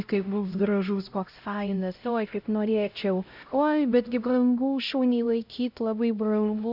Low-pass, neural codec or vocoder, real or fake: 5.4 kHz; codec, 16 kHz, 0.5 kbps, X-Codec, HuBERT features, trained on LibriSpeech; fake